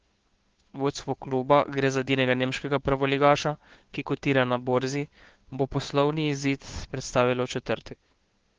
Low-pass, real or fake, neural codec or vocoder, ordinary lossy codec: 7.2 kHz; fake; codec, 16 kHz, 2 kbps, FunCodec, trained on Chinese and English, 25 frames a second; Opus, 16 kbps